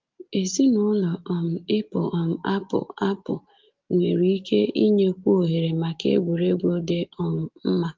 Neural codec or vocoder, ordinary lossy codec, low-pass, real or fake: none; Opus, 32 kbps; 7.2 kHz; real